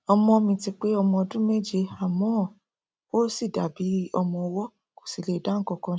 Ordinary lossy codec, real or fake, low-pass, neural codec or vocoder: none; real; none; none